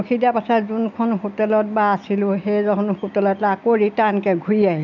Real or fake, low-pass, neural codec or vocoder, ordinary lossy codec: real; 7.2 kHz; none; none